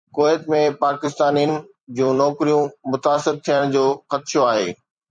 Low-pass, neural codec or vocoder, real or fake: 9.9 kHz; vocoder, 44.1 kHz, 128 mel bands every 256 samples, BigVGAN v2; fake